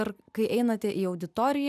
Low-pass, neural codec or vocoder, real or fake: 14.4 kHz; none; real